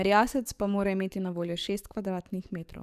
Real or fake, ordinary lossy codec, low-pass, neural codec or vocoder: fake; none; 14.4 kHz; codec, 44.1 kHz, 7.8 kbps, DAC